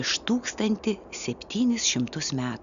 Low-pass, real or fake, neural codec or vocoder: 7.2 kHz; real; none